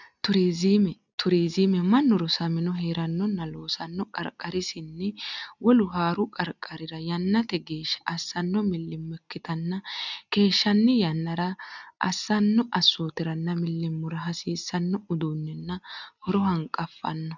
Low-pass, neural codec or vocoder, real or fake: 7.2 kHz; none; real